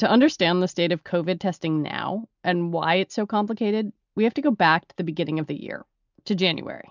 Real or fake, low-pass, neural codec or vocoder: real; 7.2 kHz; none